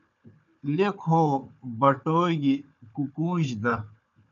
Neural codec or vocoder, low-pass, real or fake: codec, 16 kHz, 4 kbps, FunCodec, trained on Chinese and English, 50 frames a second; 7.2 kHz; fake